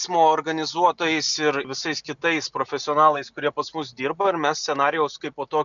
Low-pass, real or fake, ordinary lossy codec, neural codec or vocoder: 7.2 kHz; real; MP3, 96 kbps; none